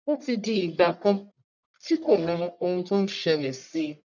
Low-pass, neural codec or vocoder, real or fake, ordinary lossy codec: 7.2 kHz; codec, 44.1 kHz, 1.7 kbps, Pupu-Codec; fake; none